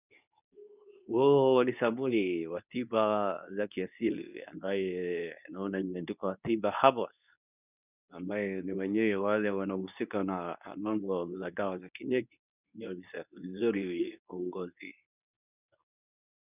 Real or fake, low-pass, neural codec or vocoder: fake; 3.6 kHz; codec, 24 kHz, 0.9 kbps, WavTokenizer, medium speech release version 2